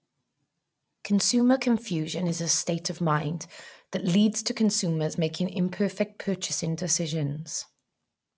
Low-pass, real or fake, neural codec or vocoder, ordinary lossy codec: none; real; none; none